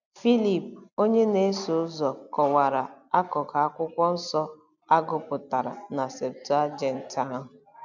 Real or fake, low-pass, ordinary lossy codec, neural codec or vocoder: real; 7.2 kHz; none; none